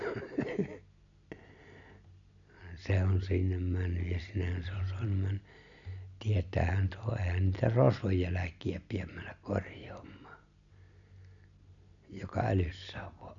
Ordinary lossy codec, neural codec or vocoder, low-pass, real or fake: none; none; 7.2 kHz; real